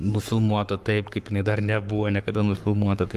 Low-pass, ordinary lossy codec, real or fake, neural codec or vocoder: 14.4 kHz; Opus, 32 kbps; fake; codec, 44.1 kHz, 7.8 kbps, Pupu-Codec